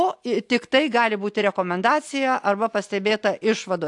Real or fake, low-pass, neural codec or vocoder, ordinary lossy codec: real; 10.8 kHz; none; AAC, 64 kbps